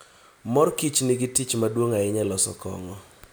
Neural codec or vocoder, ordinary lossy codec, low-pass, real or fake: none; none; none; real